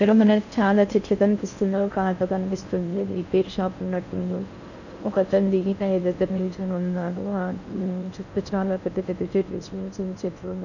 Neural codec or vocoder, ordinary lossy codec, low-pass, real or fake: codec, 16 kHz in and 24 kHz out, 0.6 kbps, FocalCodec, streaming, 4096 codes; none; 7.2 kHz; fake